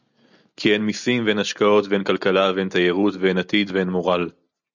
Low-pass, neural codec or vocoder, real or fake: 7.2 kHz; none; real